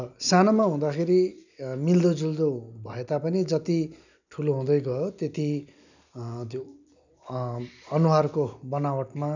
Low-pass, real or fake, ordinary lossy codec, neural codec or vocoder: 7.2 kHz; real; none; none